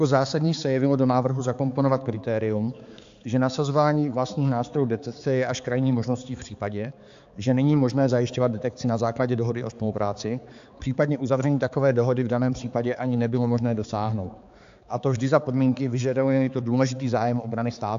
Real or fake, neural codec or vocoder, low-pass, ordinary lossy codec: fake; codec, 16 kHz, 4 kbps, X-Codec, HuBERT features, trained on balanced general audio; 7.2 kHz; AAC, 64 kbps